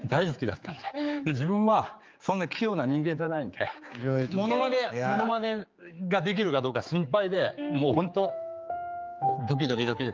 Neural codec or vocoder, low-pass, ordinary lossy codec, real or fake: codec, 16 kHz, 4 kbps, X-Codec, HuBERT features, trained on general audio; 7.2 kHz; Opus, 32 kbps; fake